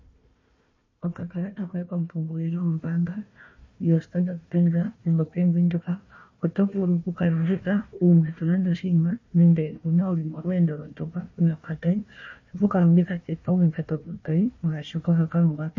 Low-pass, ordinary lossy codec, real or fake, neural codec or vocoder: 7.2 kHz; MP3, 32 kbps; fake; codec, 16 kHz, 1 kbps, FunCodec, trained on Chinese and English, 50 frames a second